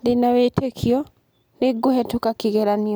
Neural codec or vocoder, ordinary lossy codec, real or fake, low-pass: none; none; real; none